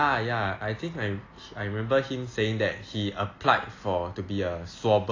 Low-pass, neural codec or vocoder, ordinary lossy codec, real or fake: 7.2 kHz; none; AAC, 32 kbps; real